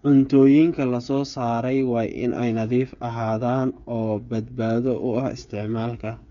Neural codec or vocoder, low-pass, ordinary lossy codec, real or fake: codec, 16 kHz, 8 kbps, FreqCodec, smaller model; 7.2 kHz; none; fake